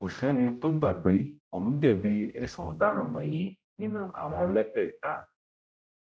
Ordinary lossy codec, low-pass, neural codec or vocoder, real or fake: none; none; codec, 16 kHz, 0.5 kbps, X-Codec, HuBERT features, trained on general audio; fake